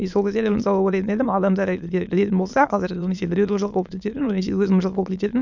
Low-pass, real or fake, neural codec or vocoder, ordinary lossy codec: 7.2 kHz; fake; autoencoder, 22.05 kHz, a latent of 192 numbers a frame, VITS, trained on many speakers; none